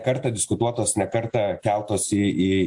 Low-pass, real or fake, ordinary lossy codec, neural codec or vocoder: 10.8 kHz; real; AAC, 64 kbps; none